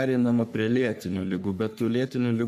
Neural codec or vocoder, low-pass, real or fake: codec, 44.1 kHz, 3.4 kbps, Pupu-Codec; 14.4 kHz; fake